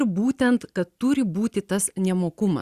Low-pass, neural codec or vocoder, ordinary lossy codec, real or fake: 14.4 kHz; none; Opus, 64 kbps; real